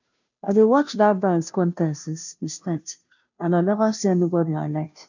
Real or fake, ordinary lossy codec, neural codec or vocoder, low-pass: fake; none; codec, 16 kHz, 0.5 kbps, FunCodec, trained on Chinese and English, 25 frames a second; 7.2 kHz